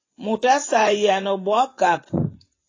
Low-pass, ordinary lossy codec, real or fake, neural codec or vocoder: 7.2 kHz; AAC, 32 kbps; fake; vocoder, 24 kHz, 100 mel bands, Vocos